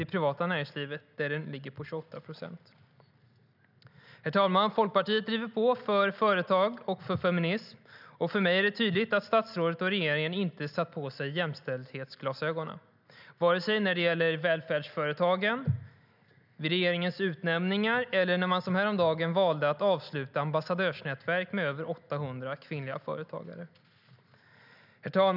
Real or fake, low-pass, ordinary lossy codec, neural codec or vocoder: real; 5.4 kHz; none; none